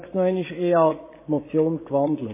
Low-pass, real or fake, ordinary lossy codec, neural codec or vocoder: 3.6 kHz; fake; MP3, 16 kbps; vocoder, 24 kHz, 100 mel bands, Vocos